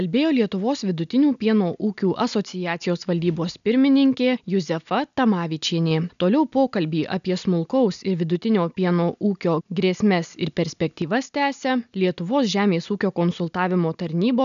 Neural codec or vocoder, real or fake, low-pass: none; real; 7.2 kHz